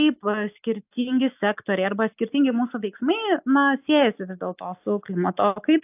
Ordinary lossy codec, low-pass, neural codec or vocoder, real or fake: AAC, 32 kbps; 3.6 kHz; none; real